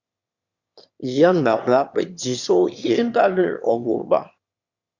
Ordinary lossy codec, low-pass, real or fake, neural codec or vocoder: Opus, 64 kbps; 7.2 kHz; fake; autoencoder, 22.05 kHz, a latent of 192 numbers a frame, VITS, trained on one speaker